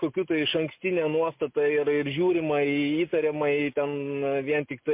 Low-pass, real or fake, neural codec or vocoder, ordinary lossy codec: 3.6 kHz; real; none; MP3, 32 kbps